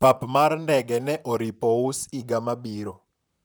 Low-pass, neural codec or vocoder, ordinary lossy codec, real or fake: none; vocoder, 44.1 kHz, 128 mel bands, Pupu-Vocoder; none; fake